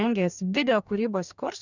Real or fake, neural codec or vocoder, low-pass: fake; codec, 44.1 kHz, 2.6 kbps, DAC; 7.2 kHz